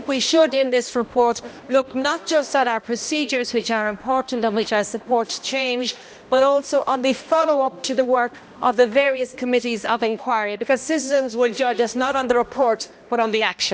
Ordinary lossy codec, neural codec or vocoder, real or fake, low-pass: none; codec, 16 kHz, 1 kbps, X-Codec, HuBERT features, trained on balanced general audio; fake; none